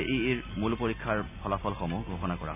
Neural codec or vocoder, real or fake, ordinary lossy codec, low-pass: none; real; none; 3.6 kHz